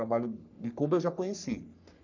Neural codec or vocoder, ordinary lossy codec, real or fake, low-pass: codec, 44.1 kHz, 3.4 kbps, Pupu-Codec; none; fake; 7.2 kHz